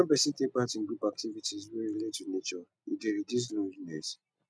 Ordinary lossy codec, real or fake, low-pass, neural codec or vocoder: none; real; none; none